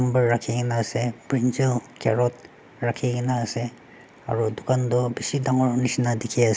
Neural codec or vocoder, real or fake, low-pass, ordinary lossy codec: none; real; none; none